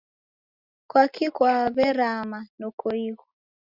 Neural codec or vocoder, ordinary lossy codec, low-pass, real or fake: none; Opus, 64 kbps; 5.4 kHz; real